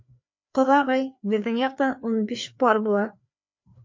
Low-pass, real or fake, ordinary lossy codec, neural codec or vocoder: 7.2 kHz; fake; MP3, 48 kbps; codec, 16 kHz, 2 kbps, FreqCodec, larger model